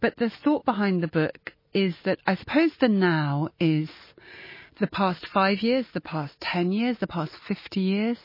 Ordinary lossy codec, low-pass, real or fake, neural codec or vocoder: MP3, 24 kbps; 5.4 kHz; real; none